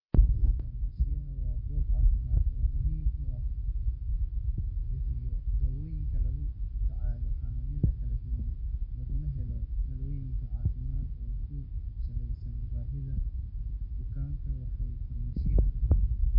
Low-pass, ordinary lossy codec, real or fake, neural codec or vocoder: 5.4 kHz; MP3, 32 kbps; real; none